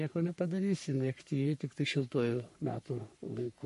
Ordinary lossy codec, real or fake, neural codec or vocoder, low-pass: MP3, 48 kbps; fake; codec, 44.1 kHz, 3.4 kbps, Pupu-Codec; 14.4 kHz